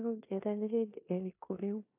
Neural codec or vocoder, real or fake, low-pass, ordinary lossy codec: codec, 16 kHz in and 24 kHz out, 0.9 kbps, LongCat-Audio-Codec, four codebook decoder; fake; 3.6 kHz; AAC, 32 kbps